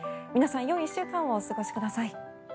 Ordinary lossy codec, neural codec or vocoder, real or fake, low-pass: none; none; real; none